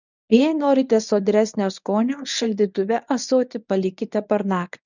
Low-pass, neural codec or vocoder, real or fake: 7.2 kHz; codec, 24 kHz, 0.9 kbps, WavTokenizer, medium speech release version 1; fake